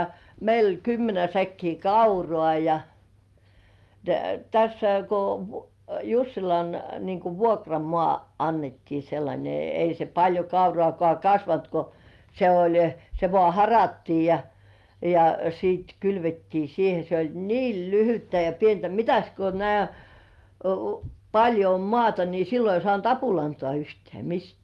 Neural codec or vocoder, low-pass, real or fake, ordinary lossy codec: none; 14.4 kHz; real; Opus, 32 kbps